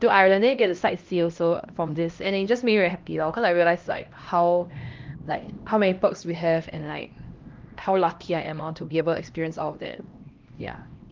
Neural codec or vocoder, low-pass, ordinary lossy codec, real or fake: codec, 16 kHz, 1 kbps, X-Codec, HuBERT features, trained on LibriSpeech; 7.2 kHz; Opus, 32 kbps; fake